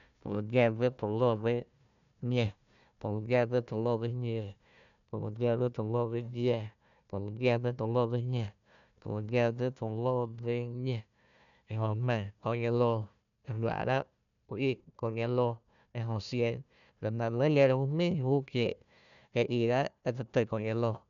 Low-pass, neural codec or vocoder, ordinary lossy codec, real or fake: 7.2 kHz; codec, 16 kHz, 1 kbps, FunCodec, trained on Chinese and English, 50 frames a second; none; fake